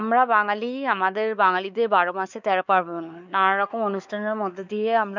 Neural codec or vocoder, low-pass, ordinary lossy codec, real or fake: codec, 16 kHz, 4 kbps, X-Codec, WavLM features, trained on Multilingual LibriSpeech; 7.2 kHz; none; fake